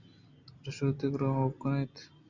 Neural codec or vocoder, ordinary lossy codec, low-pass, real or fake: none; Opus, 64 kbps; 7.2 kHz; real